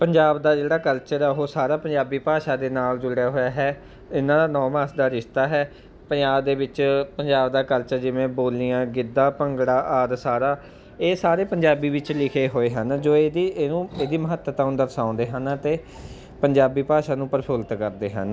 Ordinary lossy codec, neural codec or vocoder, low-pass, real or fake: none; none; none; real